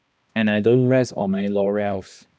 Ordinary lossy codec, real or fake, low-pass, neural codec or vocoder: none; fake; none; codec, 16 kHz, 1 kbps, X-Codec, HuBERT features, trained on balanced general audio